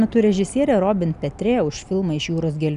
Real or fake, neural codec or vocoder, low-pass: real; none; 10.8 kHz